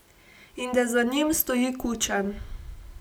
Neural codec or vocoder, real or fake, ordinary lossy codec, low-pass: none; real; none; none